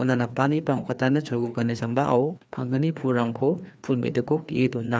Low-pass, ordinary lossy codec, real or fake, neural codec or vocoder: none; none; fake; codec, 16 kHz, 2 kbps, FreqCodec, larger model